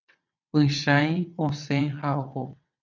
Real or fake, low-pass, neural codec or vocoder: fake; 7.2 kHz; vocoder, 22.05 kHz, 80 mel bands, WaveNeXt